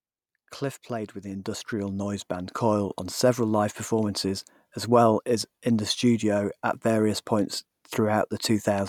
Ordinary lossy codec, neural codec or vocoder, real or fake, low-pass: none; none; real; 19.8 kHz